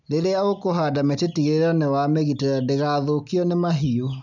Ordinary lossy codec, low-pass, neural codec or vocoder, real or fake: none; 7.2 kHz; none; real